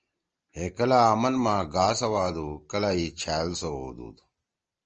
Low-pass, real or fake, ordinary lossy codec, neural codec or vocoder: 7.2 kHz; real; Opus, 24 kbps; none